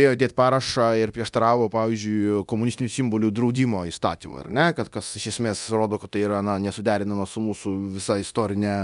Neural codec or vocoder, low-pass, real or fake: codec, 24 kHz, 0.9 kbps, DualCodec; 10.8 kHz; fake